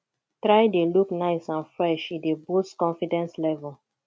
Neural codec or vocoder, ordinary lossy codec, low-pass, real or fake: none; none; none; real